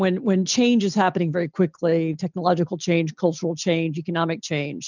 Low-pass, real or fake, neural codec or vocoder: 7.2 kHz; real; none